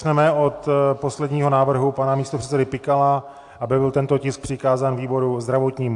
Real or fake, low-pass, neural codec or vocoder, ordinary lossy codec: real; 10.8 kHz; none; AAC, 64 kbps